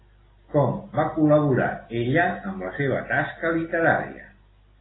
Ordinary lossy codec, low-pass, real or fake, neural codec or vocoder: AAC, 16 kbps; 7.2 kHz; real; none